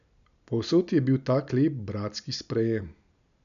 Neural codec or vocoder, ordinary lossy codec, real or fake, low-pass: none; none; real; 7.2 kHz